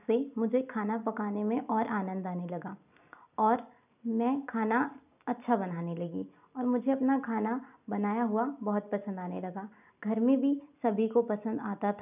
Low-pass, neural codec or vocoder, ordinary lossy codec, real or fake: 3.6 kHz; none; none; real